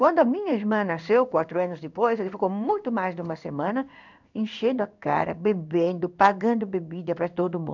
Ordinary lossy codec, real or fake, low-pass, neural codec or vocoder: none; fake; 7.2 kHz; codec, 16 kHz in and 24 kHz out, 1 kbps, XY-Tokenizer